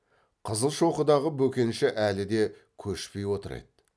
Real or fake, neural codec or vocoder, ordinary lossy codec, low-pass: real; none; none; none